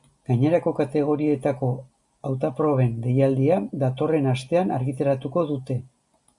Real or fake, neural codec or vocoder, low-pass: real; none; 10.8 kHz